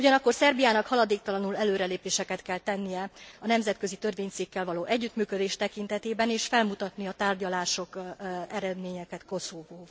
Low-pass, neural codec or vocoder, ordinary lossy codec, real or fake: none; none; none; real